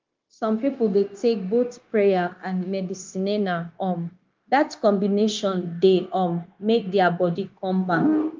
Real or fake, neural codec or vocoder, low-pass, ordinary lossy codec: fake; codec, 16 kHz, 0.9 kbps, LongCat-Audio-Codec; 7.2 kHz; Opus, 32 kbps